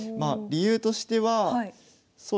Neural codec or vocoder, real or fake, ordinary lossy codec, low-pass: none; real; none; none